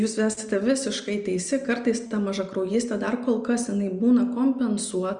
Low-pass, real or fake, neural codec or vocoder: 9.9 kHz; real; none